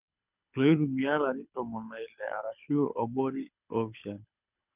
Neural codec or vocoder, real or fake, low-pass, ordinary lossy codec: codec, 24 kHz, 6 kbps, HILCodec; fake; 3.6 kHz; none